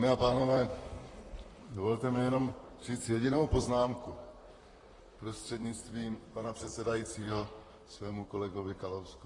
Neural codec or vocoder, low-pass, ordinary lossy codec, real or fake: vocoder, 44.1 kHz, 128 mel bands, Pupu-Vocoder; 10.8 kHz; AAC, 32 kbps; fake